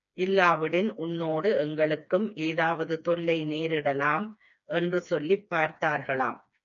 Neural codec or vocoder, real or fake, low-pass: codec, 16 kHz, 2 kbps, FreqCodec, smaller model; fake; 7.2 kHz